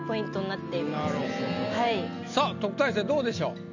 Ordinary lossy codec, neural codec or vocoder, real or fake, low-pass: none; none; real; 7.2 kHz